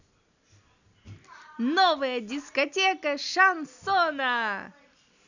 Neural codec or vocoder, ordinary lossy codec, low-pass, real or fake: none; none; 7.2 kHz; real